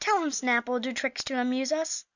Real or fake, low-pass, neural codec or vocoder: real; 7.2 kHz; none